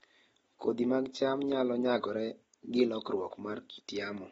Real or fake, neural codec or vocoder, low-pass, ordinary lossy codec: real; none; 19.8 kHz; AAC, 24 kbps